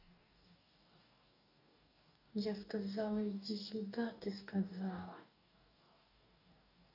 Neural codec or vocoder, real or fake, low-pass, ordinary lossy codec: codec, 44.1 kHz, 2.6 kbps, DAC; fake; 5.4 kHz; none